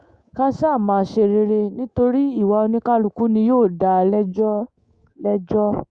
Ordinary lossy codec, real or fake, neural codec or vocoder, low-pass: Opus, 64 kbps; fake; codec, 24 kHz, 3.1 kbps, DualCodec; 9.9 kHz